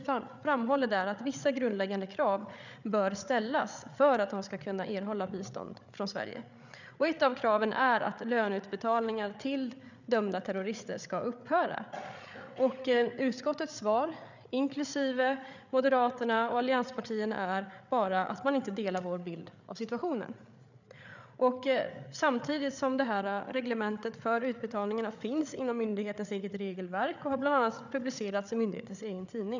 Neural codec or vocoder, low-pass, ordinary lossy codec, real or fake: codec, 16 kHz, 8 kbps, FreqCodec, larger model; 7.2 kHz; none; fake